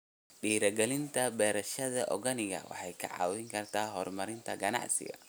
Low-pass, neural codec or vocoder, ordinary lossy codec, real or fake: none; none; none; real